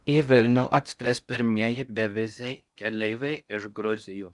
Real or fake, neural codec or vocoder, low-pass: fake; codec, 16 kHz in and 24 kHz out, 0.6 kbps, FocalCodec, streaming, 4096 codes; 10.8 kHz